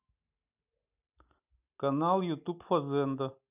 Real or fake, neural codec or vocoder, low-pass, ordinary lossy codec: real; none; 3.6 kHz; none